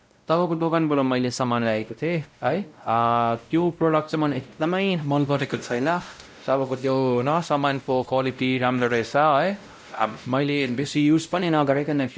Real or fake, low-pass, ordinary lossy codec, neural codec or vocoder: fake; none; none; codec, 16 kHz, 0.5 kbps, X-Codec, WavLM features, trained on Multilingual LibriSpeech